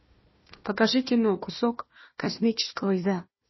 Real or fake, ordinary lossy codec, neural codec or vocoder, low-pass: fake; MP3, 24 kbps; codec, 16 kHz, 1 kbps, FunCodec, trained on Chinese and English, 50 frames a second; 7.2 kHz